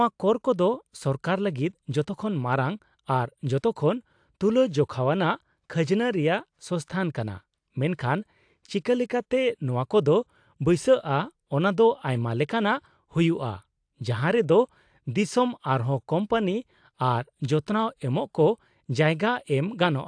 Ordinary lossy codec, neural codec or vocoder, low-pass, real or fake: AAC, 64 kbps; none; 9.9 kHz; real